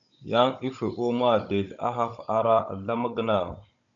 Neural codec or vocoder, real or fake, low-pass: codec, 16 kHz, 16 kbps, FunCodec, trained on Chinese and English, 50 frames a second; fake; 7.2 kHz